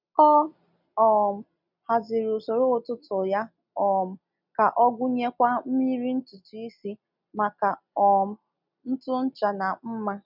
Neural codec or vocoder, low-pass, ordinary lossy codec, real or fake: none; 5.4 kHz; none; real